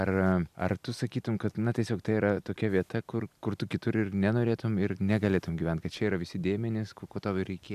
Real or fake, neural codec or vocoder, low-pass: real; none; 14.4 kHz